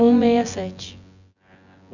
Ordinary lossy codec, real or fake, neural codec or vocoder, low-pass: none; fake; vocoder, 24 kHz, 100 mel bands, Vocos; 7.2 kHz